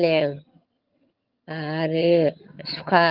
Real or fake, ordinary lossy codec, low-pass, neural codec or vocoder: fake; Opus, 24 kbps; 5.4 kHz; vocoder, 22.05 kHz, 80 mel bands, HiFi-GAN